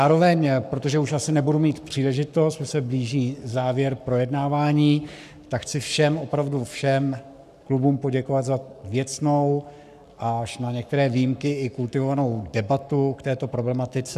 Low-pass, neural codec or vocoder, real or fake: 14.4 kHz; codec, 44.1 kHz, 7.8 kbps, Pupu-Codec; fake